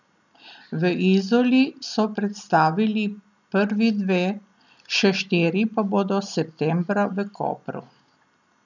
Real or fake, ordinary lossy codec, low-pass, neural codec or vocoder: real; none; 7.2 kHz; none